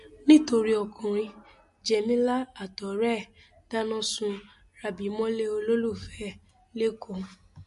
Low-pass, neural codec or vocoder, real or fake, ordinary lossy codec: 14.4 kHz; none; real; MP3, 48 kbps